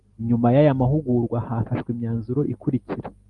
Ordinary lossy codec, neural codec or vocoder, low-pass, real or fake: Opus, 32 kbps; none; 10.8 kHz; real